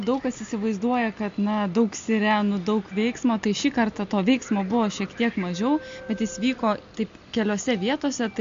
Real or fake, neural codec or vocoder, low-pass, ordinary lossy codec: real; none; 7.2 kHz; MP3, 48 kbps